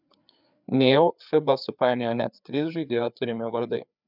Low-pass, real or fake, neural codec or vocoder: 5.4 kHz; fake; codec, 16 kHz, 4 kbps, FreqCodec, larger model